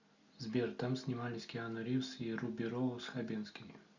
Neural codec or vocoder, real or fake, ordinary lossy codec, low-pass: none; real; MP3, 64 kbps; 7.2 kHz